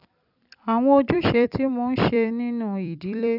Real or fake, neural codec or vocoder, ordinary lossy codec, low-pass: fake; vocoder, 44.1 kHz, 128 mel bands every 256 samples, BigVGAN v2; none; 5.4 kHz